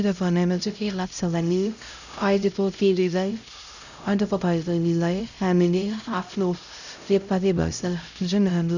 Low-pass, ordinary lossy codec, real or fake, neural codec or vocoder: 7.2 kHz; none; fake; codec, 16 kHz, 0.5 kbps, X-Codec, HuBERT features, trained on LibriSpeech